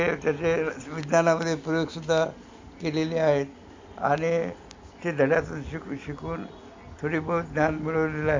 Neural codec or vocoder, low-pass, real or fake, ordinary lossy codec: none; 7.2 kHz; real; MP3, 48 kbps